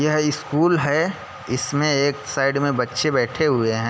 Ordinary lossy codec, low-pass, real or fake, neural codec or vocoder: none; none; real; none